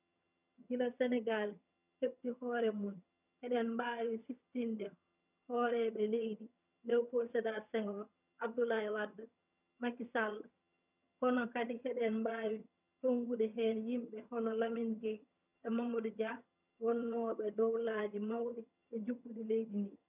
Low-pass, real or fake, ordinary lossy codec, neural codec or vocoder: 3.6 kHz; fake; none; vocoder, 22.05 kHz, 80 mel bands, HiFi-GAN